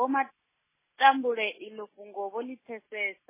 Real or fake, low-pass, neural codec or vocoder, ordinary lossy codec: real; 3.6 kHz; none; MP3, 16 kbps